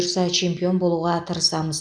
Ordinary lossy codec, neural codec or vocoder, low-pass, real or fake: none; none; 9.9 kHz; real